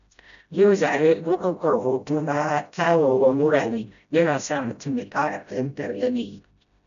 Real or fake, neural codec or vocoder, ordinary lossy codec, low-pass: fake; codec, 16 kHz, 0.5 kbps, FreqCodec, smaller model; none; 7.2 kHz